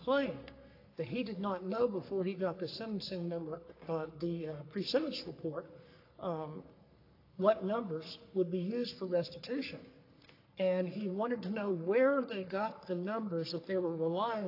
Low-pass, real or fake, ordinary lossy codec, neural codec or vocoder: 5.4 kHz; fake; AAC, 32 kbps; codec, 44.1 kHz, 3.4 kbps, Pupu-Codec